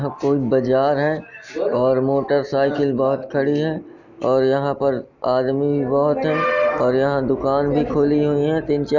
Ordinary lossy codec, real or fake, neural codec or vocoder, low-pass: none; real; none; 7.2 kHz